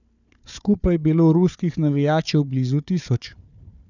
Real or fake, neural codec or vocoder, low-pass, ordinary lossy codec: fake; codec, 16 kHz, 4 kbps, FunCodec, trained on Chinese and English, 50 frames a second; 7.2 kHz; none